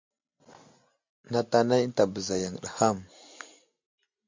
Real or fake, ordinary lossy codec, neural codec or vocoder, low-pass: real; MP3, 48 kbps; none; 7.2 kHz